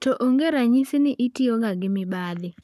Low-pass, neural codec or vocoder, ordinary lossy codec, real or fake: 14.4 kHz; codec, 44.1 kHz, 7.8 kbps, Pupu-Codec; none; fake